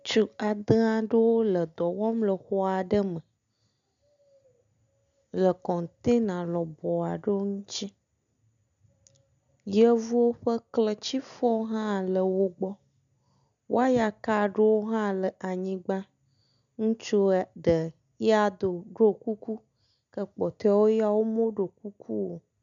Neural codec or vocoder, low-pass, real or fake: none; 7.2 kHz; real